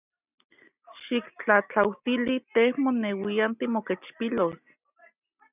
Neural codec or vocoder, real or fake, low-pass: none; real; 3.6 kHz